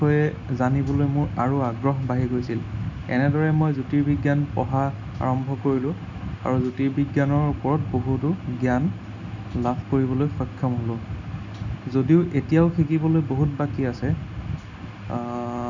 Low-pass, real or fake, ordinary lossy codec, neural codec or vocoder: 7.2 kHz; real; none; none